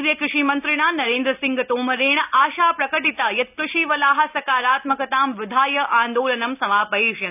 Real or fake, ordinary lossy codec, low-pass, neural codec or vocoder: real; none; 3.6 kHz; none